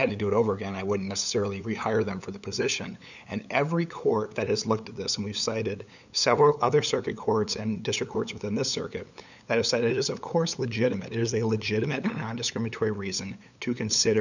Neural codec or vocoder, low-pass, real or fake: codec, 16 kHz, 8 kbps, FunCodec, trained on LibriTTS, 25 frames a second; 7.2 kHz; fake